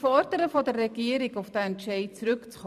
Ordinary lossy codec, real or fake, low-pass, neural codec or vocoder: MP3, 96 kbps; fake; 14.4 kHz; vocoder, 44.1 kHz, 128 mel bands every 256 samples, BigVGAN v2